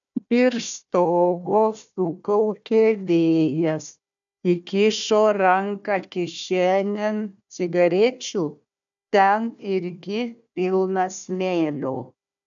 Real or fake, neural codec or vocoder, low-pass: fake; codec, 16 kHz, 1 kbps, FunCodec, trained on Chinese and English, 50 frames a second; 7.2 kHz